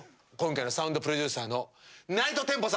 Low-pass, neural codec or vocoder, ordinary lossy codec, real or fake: none; none; none; real